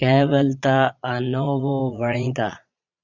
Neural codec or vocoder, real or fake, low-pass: vocoder, 22.05 kHz, 80 mel bands, Vocos; fake; 7.2 kHz